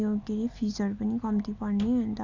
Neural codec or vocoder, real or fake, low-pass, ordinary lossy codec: none; real; 7.2 kHz; none